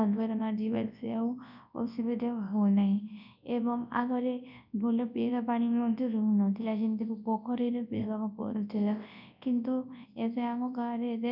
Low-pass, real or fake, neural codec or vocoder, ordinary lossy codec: 5.4 kHz; fake; codec, 24 kHz, 0.9 kbps, WavTokenizer, large speech release; none